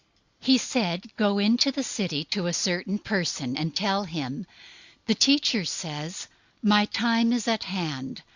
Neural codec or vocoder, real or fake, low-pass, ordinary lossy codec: none; real; 7.2 kHz; Opus, 64 kbps